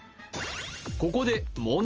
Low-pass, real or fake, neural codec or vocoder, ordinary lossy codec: 7.2 kHz; real; none; Opus, 24 kbps